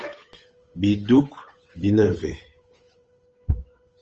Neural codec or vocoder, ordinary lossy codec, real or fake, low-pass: codec, 16 kHz, 8 kbps, FunCodec, trained on Chinese and English, 25 frames a second; Opus, 24 kbps; fake; 7.2 kHz